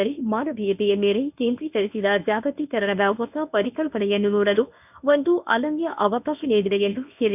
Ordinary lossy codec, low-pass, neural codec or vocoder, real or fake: none; 3.6 kHz; codec, 24 kHz, 0.9 kbps, WavTokenizer, medium speech release version 1; fake